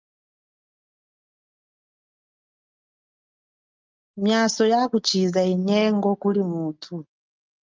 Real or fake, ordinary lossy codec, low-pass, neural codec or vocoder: real; Opus, 32 kbps; 7.2 kHz; none